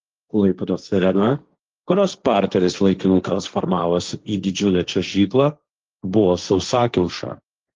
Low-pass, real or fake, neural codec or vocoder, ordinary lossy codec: 7.2 kHz; fake; codec, 16 kHz, 1.1 kbps, Voila-Tokenizer; Opus, 32 kbps